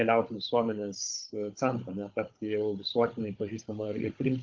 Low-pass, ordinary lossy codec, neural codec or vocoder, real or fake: 7.2 kHz; Opus, 32 kbps; codec, 16 kHz, 16 kbps, FunCodec, trained on Chinese and English, 50 frames a second; fake